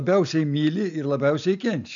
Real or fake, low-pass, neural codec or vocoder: real; 7.2 kHz; none